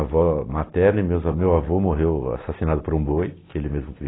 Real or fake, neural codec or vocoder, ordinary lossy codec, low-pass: real; none; AAC, 16 kbps; 7.2 kHz